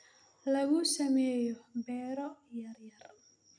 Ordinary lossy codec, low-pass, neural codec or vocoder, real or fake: none; 9.9 kHz; none; real